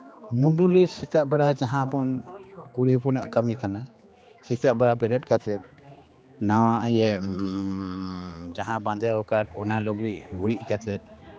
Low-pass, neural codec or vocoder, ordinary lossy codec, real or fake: none; codec, 16 kHz, 2 kbps, X-Codec, HuBERT features, trained on general audio; none; fake